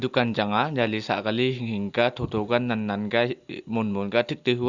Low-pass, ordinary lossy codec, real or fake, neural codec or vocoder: 7.2 kHz; Opus, 64 kbps; real; none